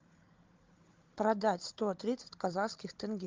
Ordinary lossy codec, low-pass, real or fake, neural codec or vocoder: Opus, 24 kbps; 7.2 kHz; fake; codec, 16 kHz, 16 kbps, FunCodec, trained on Chinese and English, 50 frames a second